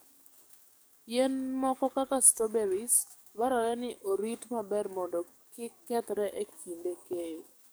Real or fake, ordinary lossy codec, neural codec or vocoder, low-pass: fake; none; codec, 44.1 kHz, 7.8 kbps, DAC; none